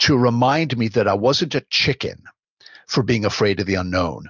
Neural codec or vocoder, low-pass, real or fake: none; 7.2 kHz; real